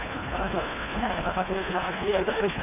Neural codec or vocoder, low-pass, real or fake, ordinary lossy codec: codec, 24 kHz, 1.5 kbps, HILCodec; 3.6 kHz; fake; none